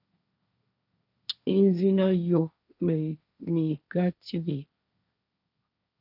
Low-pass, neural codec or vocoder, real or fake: 5.4 kHz; codec, 16 kHz, 1.1 kbps, Voila-Tokenizer; fake